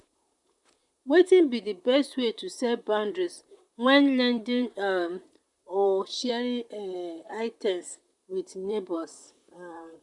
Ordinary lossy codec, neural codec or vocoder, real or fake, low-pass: none; vocoder, 44.1 kHz, 128 mel bands, Pupu-Vocoder; fake; 10.8 kHz